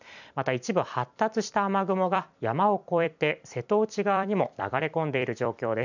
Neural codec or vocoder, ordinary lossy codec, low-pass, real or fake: vocoder, 44.1 kHz, 128 mel bands every 256 samples, BigVGAN v2; none; 7.2 kHz; fake